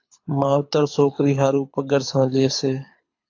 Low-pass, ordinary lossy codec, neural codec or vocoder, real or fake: 7.2 kHz; AAC, 48 kbps; codec, 24 kHz, 6 kbps, HILCodec; fake